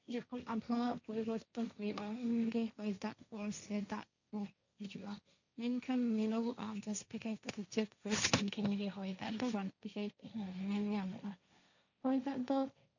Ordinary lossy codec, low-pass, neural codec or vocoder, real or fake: none; 7.2 kHz; codec, 16 kHz, 1.1 kbps, Voila-Tokenizer; fake